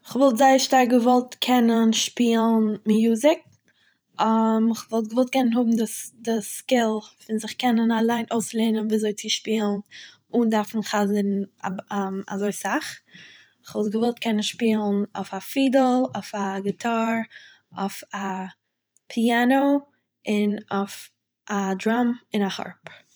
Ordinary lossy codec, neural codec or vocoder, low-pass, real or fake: none; vocoder, 44.1 kHz, 128 mel bands every 512 samples, BigVGAN v2; none; fake